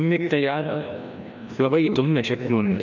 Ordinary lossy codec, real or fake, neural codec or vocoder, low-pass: none; fake; codec, 16 kHz, 1 kbps, FreqCodec, larger model; 7.2 kHz